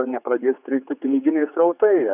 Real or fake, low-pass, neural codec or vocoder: fake; 3.6 kHz; codec, 16 kHz, 4 kbps, X-Codec, HuBERT features, trained on general audio